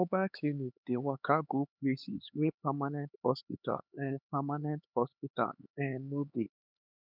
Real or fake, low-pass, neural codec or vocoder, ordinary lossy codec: fake; 5.4 kHz; codec, 16 kHz, 4 kbps, X-Codec, HuBERT features, trained on LibriSpeech; none